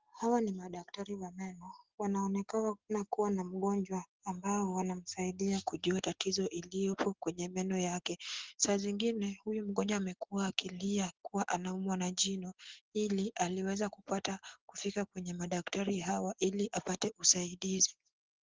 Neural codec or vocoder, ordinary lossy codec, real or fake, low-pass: none; Opus, 16 kbps; real; 7.2 kHz